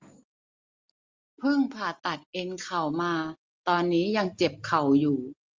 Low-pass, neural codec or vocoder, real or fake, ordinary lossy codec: 7.2 kHz; none; real; Opus, 32 kbps